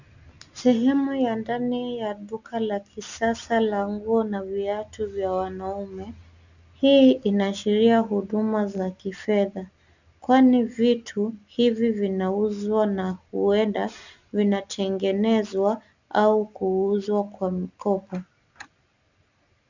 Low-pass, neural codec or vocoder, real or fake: 7.2 kHz; none; real